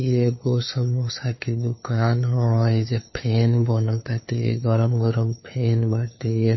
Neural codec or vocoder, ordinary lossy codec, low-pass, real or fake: codec, 16 kHz, 2 kbps, FunCodec, trained on LibriTTS, 25 frames a second; MP3, 24 kbps; 7.2 kHz; fake